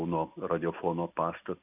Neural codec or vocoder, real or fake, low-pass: none; real; 3.6 kHz